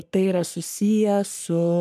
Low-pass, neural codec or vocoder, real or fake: 14.4 kHz; codec, 44.1 kHz, 3.4 kbps, Pupu-Codec; fake